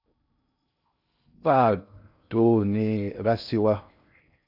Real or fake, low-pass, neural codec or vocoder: fake; 5.4 kHz; codec, 16 kHz in and 24 kHz out, 0.6 kbps, FocalCodec, streaming, 2048 codes